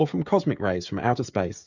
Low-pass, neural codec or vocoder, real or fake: 7.2 kHz; codec, 16 kHz, 16 kbps, FreqCodec, smaller model; fake